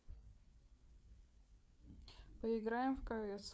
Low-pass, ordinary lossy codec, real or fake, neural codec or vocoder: none; none; fake; codec, 16 kHz, 4 kbps, FreqCodec, larger model